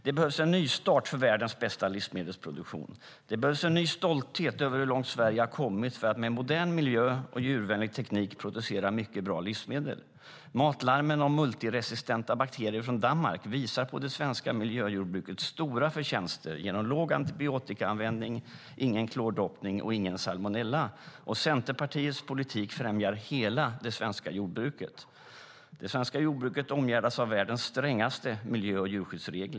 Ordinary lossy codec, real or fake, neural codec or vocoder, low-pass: none; real; none; none